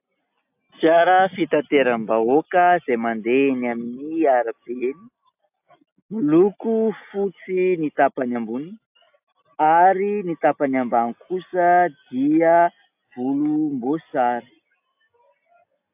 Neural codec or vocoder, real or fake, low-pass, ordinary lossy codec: none; real; 3.6 kHz; AAC, 32 kbps